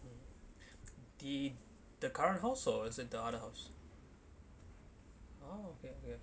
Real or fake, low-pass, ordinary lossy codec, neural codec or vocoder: real; none; none; none